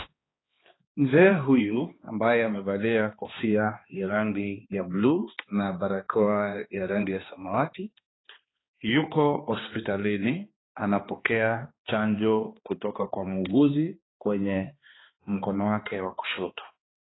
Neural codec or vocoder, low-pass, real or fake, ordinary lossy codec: codec, 16 kHz, 2 kbps, X-Codec, HuBERT features, trained on balanced general audio; 7.2 kHz; fake; AAC, 16 kbps